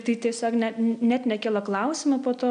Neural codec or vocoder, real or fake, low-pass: none; real; 9.9 kHz